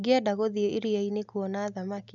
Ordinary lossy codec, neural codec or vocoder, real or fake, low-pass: none; none; real; 7.2 kHz